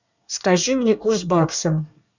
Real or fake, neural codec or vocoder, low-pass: fake; codec, 24 kHz, 1 kbps, SNAC; 7.2 kHz